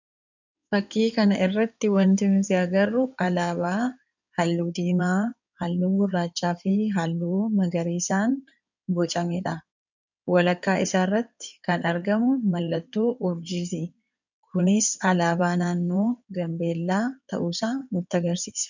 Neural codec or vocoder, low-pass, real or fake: codec, 16 kHz in and 24 kHz out, 2.2 kbps, FireRedTTS-2 codec; 7.2 kHz; fake